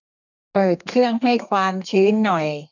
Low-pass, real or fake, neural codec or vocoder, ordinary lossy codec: 7.2 kHz; fake; codec, 32 kHz, 1.9 kbps, SNAC; none